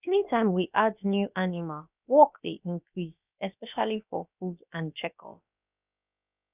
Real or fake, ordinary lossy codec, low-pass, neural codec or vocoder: fake; none; 3.6 kHz; codec, 16 kHz, about 1 kbps, DyCAST, with the encoder's durations